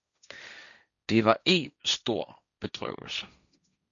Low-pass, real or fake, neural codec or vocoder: 7.2 kHz; fake; codec, 16 kHz, 1.1 kbps, Voila-Tokenizer